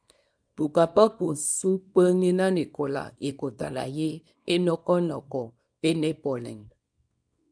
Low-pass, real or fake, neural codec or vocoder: 9.9 kHz; fake; codec, 24 kHz, 0.9 kbps, WavTokenizer, small release